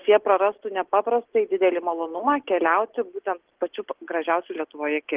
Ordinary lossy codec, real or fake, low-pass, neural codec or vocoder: Opus, 16 kbps; real; 3.6 kHz; none